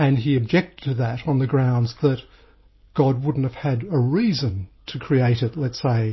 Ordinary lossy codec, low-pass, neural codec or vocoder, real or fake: MP3, 24 kbps; 7.2 kHz; none; real